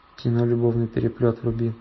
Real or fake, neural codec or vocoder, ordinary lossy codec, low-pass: real; none; MP3, 24 kbps; 7.2 kHz